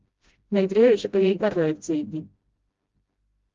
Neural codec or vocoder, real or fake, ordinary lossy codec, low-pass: codec, 16 kHz, 0.5 kbps, FreqCodec, smaller model; fake; Opus, 32 kbps; 7.2 kHz